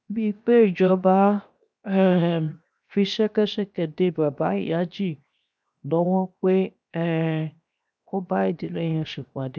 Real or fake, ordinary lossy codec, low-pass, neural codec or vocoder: fake; none; none; codec, 16 kHz, 0.7 kbps, FocalCodec